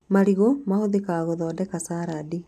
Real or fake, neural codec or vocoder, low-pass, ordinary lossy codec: real; none; 14.4 kHz; none